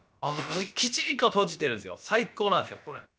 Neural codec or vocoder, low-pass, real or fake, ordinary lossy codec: codec, 16 kHz, about 1 kbps, DyCAST, with the encoder's durations; none; fake; none